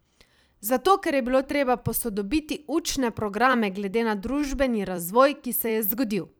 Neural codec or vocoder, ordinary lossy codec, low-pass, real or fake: vocoder, 44.1 kHz, 128 mel bands every 256 samples, BigVGAN v2; none; none; fake